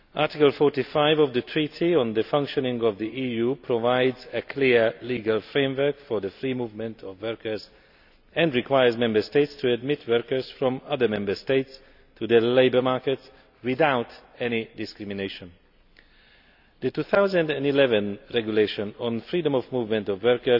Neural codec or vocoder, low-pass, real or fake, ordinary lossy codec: none; 5.4 kHz; real; none